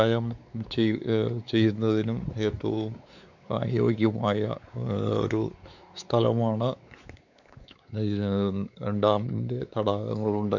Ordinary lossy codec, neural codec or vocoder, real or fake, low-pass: none; codec, 16 kHz, 4 kbps, X-Codec, WavLM features, trained on Multilingual LibriSpeech; fake; 7.2 kHz